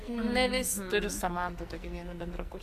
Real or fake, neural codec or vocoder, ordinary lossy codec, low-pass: fake; codec, 44.1 kHz, 2.6 kbps, SNAC; MP3, 96 kbps; 14.4 kHz